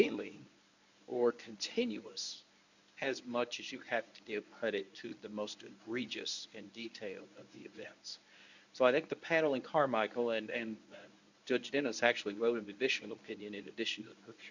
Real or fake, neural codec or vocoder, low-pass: fake; codec, 24 kHz, 0.9 kbps, WavTokenizer, medium speech release version 1; 7.2 kHz